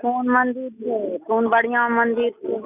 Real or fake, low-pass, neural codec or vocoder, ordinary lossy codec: real; 3.6 kHz; none; none